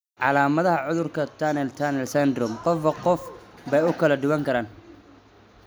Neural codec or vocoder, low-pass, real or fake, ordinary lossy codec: none; none; real; none